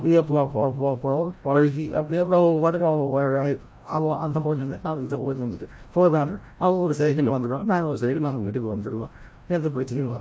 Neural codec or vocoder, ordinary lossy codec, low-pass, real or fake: codec, 16 kHz, 0.5 kbps, FreqCodec, larger model; none; none; fake